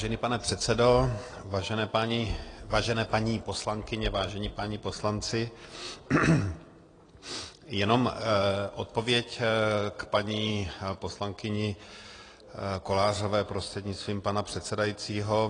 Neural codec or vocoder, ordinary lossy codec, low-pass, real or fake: none; AAC, 32 kbps; 9.9 kHz; real